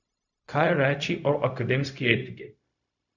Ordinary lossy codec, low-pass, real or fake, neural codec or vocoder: none; 7.2 kHz; fake; codec, 16 kHz, 0.4 kbps, LongCat-Audio-Codec